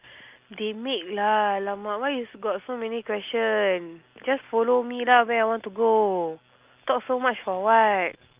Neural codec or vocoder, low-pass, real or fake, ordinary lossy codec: none; 3.6 kHz; real; Opus, 32 kbps